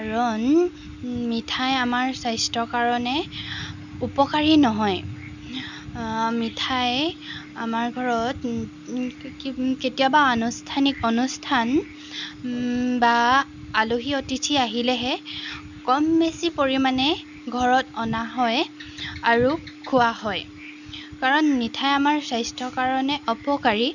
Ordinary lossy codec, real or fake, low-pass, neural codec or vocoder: none; real; 7.2 kHz; none